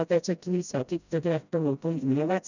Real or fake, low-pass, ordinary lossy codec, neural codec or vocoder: fake; 7.2 kHz; none; codec, 16 kHz, 0.5 kbps, FreqCodec, smaller model